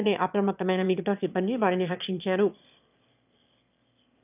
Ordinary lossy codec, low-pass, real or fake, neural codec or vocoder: none; 3.6 kHz; fake; autoencoder, 22.05 kHz, a latent of 192 numbers a frame, VITS, trained on one speaker